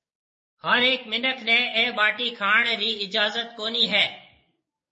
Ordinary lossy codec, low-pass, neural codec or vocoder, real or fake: MP3, 32 kbps; 10.8 kHz; codec, 44.1 kHz, 7.8 kbps, DAC; fake